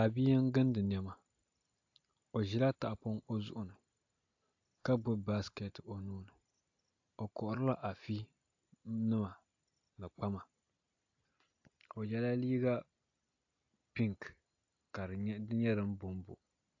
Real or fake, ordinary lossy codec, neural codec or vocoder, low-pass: real; AAC, 48 kbps; none; 7.2 kHz